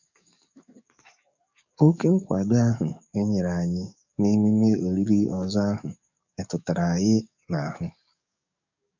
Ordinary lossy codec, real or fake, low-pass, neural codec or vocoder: none; fake; 7.2 kHz; codec, 44.1 kHz, 7.8 kbps, DAC